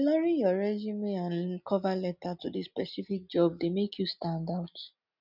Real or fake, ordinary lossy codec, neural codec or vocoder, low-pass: real; none; none; 5.4 kHz